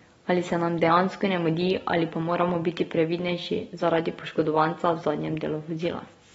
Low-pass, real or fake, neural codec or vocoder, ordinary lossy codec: 9.9 kHz; real; none; AAC, 24 kbps